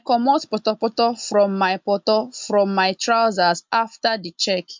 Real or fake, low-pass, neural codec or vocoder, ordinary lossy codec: real; 7.2 kHz; none; MP3, 64 kbps